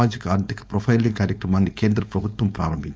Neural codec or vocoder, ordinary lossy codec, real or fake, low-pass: codec, 16 kHz, 4.8 kbps, FACodec; none; fake; none